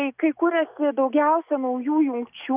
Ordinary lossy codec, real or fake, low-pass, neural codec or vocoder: Opus, 64 kbps; real; 3.6 kHz; none